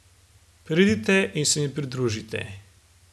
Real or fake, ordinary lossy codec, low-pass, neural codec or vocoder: real; none; none; none